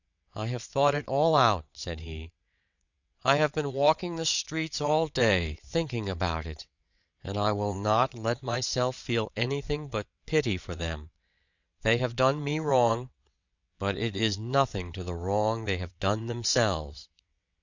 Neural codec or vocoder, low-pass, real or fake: vocoder, 22.05 kHz, 80 mel bands, WaveNeXt; 7.2 kHz; fake